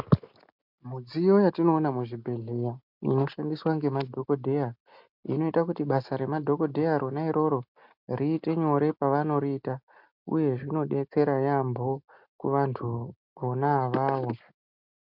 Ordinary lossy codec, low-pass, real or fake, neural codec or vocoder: MP3, 48 kbps; 5.4 kHz; real; none